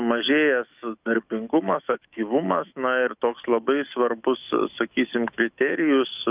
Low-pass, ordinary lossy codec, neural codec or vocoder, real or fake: 3.6 kHz; Opus, 24 kbps; autoencoder, 48 kHz, 128 numbers a frame, DAC-VAE, trained on Japanese speech; fake